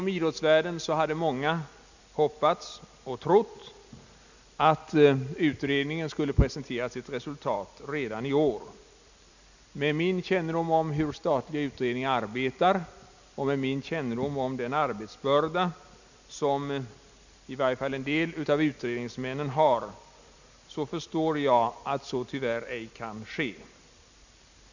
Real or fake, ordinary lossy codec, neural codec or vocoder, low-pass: real; none; none; 7.2 kHz